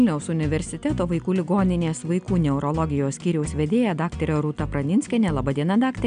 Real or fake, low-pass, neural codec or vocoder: real; 9.9 kHz; none